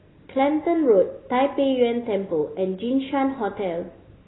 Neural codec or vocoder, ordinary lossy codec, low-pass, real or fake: none; AAC, 16 kbps; 7.2 kHz; real